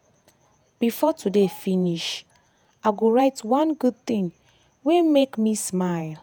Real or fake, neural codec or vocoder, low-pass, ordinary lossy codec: fake; vocoder, 48 kHz, 128 mel bands, Vocos; none; none